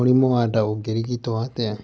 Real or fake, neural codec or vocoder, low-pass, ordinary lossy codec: real; none; none; none